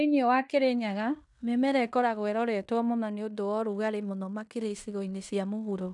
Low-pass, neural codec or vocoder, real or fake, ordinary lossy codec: 10.8 kHz; codec, 16 kHz in and 24 kHz out, 0.9 kbps, LongCat-Audio-Codec, fine tuned four codebook decoder; fake; none